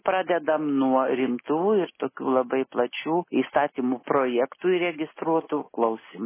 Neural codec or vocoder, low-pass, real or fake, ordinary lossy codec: none; 3.6 kHz; real; MP3, 16 kbps